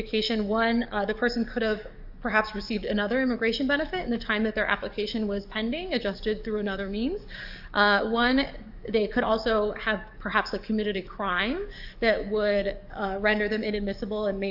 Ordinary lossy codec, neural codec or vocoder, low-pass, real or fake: AAC, 48 kbps; codec, 44.1 kHz, 7.8 kbps, DAC; 5.4 kHz; fake